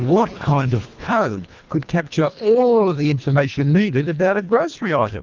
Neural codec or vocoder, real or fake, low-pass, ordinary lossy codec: codec, 24 kHz, 1.5 kbps, HILCodec; fake; 7.2 kHz; Opus, 32 kbps